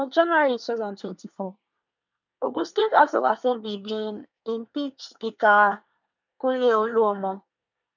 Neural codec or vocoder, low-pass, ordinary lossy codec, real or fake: codec, 24 kHz, 1 kbps, SNAC; 7.2 kHz; none; fake